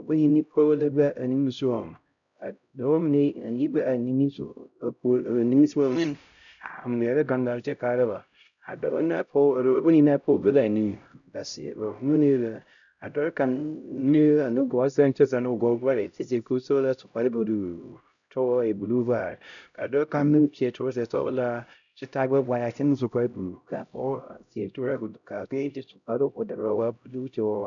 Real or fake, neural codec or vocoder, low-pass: fake; codec, 16 kHz, 0.5 kbps, X-Codec, HuBERT features, trained on LibriSpeech; 7.2 kHz